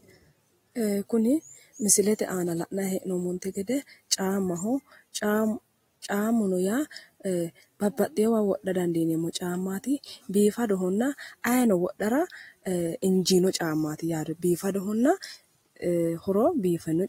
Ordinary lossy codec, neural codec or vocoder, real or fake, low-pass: AAC, 48 kbps; none; real; 19.8 kHz